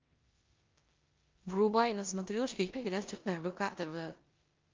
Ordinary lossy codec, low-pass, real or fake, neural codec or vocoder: Opus, 32 kbps; 7.2 kHz; fake; codec, 16 kHz in and 24 kHz out, 0.9 kbps, LongCat-Audio-Codec, four codebook decoder